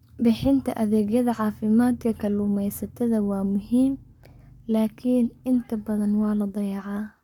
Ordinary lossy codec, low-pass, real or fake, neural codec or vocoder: MP3, 96 kbps; 19.8 kHz; fake; codec, 44.1 kHz, 7.8 kbps, Pupu-Codec